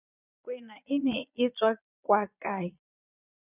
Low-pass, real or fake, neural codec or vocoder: 3.6 kHz; fake; vocoder, 22.05 kHz, 80 mel bands, Vocos